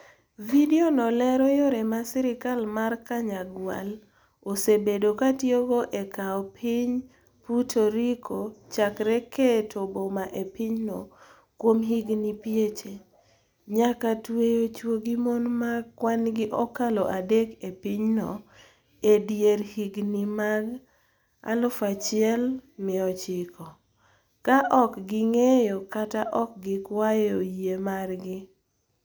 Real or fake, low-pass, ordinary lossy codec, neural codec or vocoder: real; none; none; none